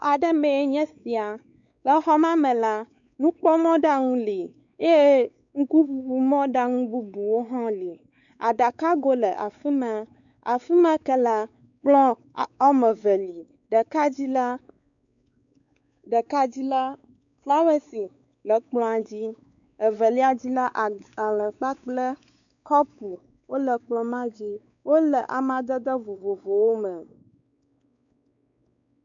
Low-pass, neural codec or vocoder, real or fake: 7.2 kHz; codec, 16 kHz, 4 kbps, X-Codec, WavLM features, trained on Multilingual LibriSpeech; fake